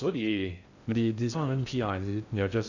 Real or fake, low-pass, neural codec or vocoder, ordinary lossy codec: fake; 7.2 kHz; codec, 16 kHz in and 24 kHz out, 0.6 kbps, FocalCodec, streaming, 2048 codes; none